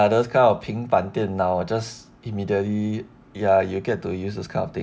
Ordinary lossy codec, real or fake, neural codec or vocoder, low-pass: none; real; none; none